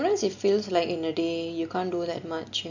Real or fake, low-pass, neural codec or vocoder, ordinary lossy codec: real; 7.2 kHz; none; none